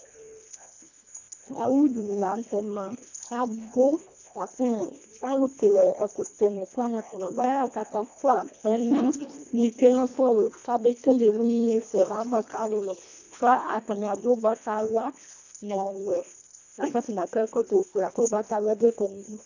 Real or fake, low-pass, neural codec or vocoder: fake; 7.2 kHz; codec, 24 kHz, 1.5 kbps, HILCodec